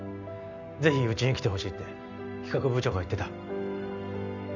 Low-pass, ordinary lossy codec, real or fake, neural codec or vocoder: 7.2 kHz; none; real; none